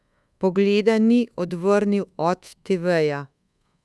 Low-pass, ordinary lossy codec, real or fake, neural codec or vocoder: none; none; fake; codec, 24 kHz, 1.2 kbps, DualCodec